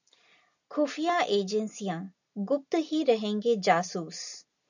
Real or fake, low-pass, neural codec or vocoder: real; 7.2 kHz; none